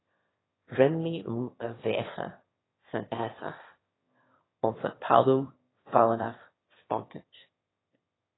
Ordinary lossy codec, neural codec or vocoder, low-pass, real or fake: AAC, 16 kbps; autoencoder, 22.05 kHz, a latent of 192 numbers a frame, VITS, trained on one speaker; 7.2 kHz; fake